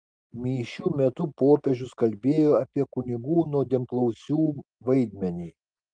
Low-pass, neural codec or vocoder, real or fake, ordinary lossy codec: 9.9 kHz; none; real; Opus, 24 kbps